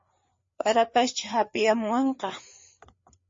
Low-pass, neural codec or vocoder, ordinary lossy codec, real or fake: 7.2 kHz; codec, 16 kHz, 4 kbps, FreqCodec, larger model; MP3, 32 kbps; fake